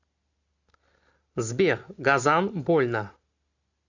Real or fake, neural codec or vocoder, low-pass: real; none; 7.2 kHz